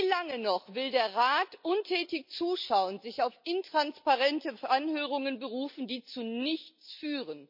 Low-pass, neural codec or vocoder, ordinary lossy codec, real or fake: 5.4 kHz; none; MP3, 32 kbps; real